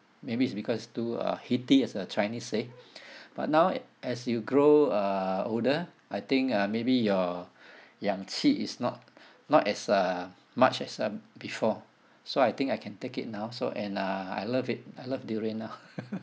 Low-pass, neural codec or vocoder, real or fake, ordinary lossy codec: none; none; real; none